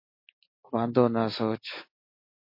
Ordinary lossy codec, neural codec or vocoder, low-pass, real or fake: MP3, 32 kbps; none; 5.4 kHz; real